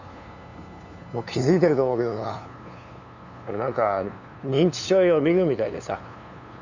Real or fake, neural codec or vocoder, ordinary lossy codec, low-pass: fake; codec, 16 kHz, 2 kbps, FunCodec, trained on LibriTTS, 25 frames a second; none; 7.2 kHz